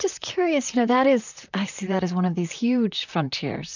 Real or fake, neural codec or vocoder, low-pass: fake; vocoder, 44.1 kHz, 128 mel bands, Pupu-Vocoder; 7.2 kHz